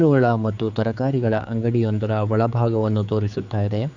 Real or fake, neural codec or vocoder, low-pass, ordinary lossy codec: fake; codec, 16 kHz, 4 kbps, X-Codec, HuBERT features, trained on general audio; 7.2 kHz; none